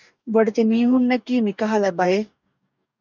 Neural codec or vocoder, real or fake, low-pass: codec, 44.1 kHz, 2.6 kbps, DAC; fake; 7.2 kHz